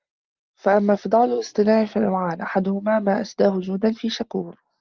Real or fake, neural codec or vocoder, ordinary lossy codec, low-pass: real; none; Opus, 32 kbps; 7.2 kHz